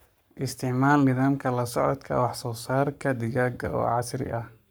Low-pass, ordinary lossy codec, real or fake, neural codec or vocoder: none; none; fake; codec, 44.1 kHz, 7.8 kbps, Pupu-Codec